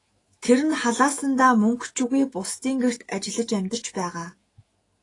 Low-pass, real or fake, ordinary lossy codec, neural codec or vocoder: 10.8 kHz; fake; AAC, 32 kbps; autoencoder, 48 kHz, 128 numbers a frame, DAC-VAE, trained on Japanese speech